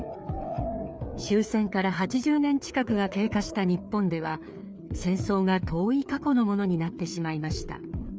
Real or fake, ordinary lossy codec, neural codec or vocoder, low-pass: fake; none; codec, 16 kHz, 4 kbps, FreqCodec, larger model; none